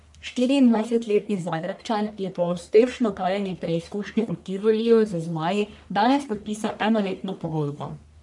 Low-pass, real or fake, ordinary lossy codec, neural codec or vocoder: 10.8 kHz; fake; none; codec, 44.1 kHz, 1.7 kbps, Pupu-Codec